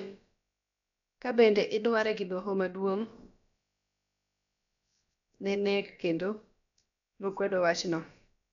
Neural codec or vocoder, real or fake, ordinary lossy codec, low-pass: codec, 16 kHz, about 1 kbps, DyCAST, with the encoder's durations; fake; none; 7.2 kHz